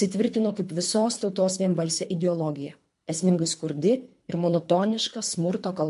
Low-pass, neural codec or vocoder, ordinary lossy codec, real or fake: 10.8 kHz; codec, 24 kHz, 3 kbps, HILCodec; MP3, 64 kbps; fake